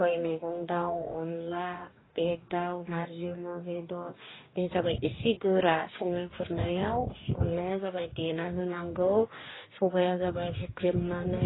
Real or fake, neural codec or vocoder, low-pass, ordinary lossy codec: fake; codec, 44.1 kHz, 2.6 kbps, DAC; 7.2 kHz; AAC, 16 kbps